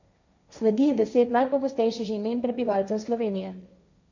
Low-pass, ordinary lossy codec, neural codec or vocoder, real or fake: 7.2 kHz; none; codec, 16 kHz, 1.1 kbps, Voila-Tokenizer; fake